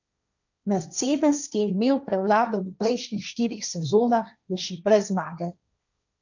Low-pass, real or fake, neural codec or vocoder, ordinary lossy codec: 7.2 kHz; fake; codec, 16 kHz, 1.1 kbps, Voila-Tokenizer; none